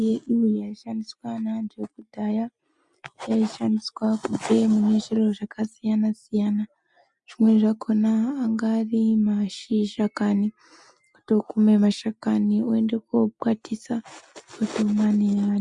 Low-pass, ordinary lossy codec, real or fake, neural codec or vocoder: 10.8 kHz; MP3, 96 kbps; real; none